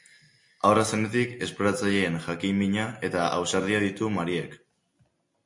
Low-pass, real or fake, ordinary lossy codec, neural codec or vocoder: 10.8 kHz; real; MP3, 48 kbps; none